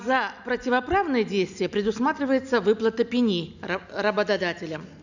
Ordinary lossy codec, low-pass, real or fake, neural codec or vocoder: none; 7.2 kHz; real; none